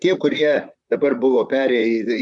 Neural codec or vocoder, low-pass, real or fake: vocoder, 44.1 kHz, 128 mel bands, Pupu-Vocoder; 10.8 kHz; fake